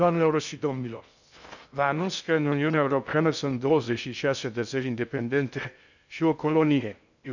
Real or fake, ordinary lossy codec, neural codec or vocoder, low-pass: fake; none; codec, 16 kHz in and 24 kHz out, 0.6 kbps, FocalCodec, streaming, 2048 codes; 7.2 kHz